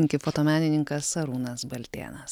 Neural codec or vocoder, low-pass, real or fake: none; 19.8 kHz; real